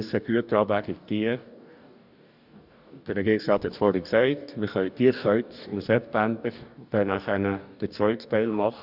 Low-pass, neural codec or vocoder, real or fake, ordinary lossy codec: 5.4 kHz; codec, 44.1 kHz, 2.6 kbps, DAC; fake; none